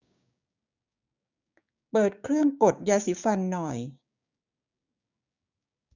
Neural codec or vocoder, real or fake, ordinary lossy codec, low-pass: codec, 16 kHz, 6 kbps, DAC; fake; none; 7.2 kHz